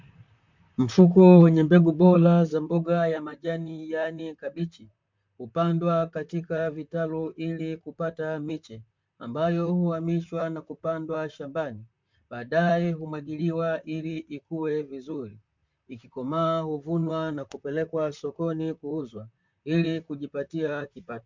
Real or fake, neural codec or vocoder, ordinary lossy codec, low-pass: fake; vocoder, 44.1 kHz, 128 mel bands, Pupu-Vocoder; MP3, 64 kbps; 7.2 kHz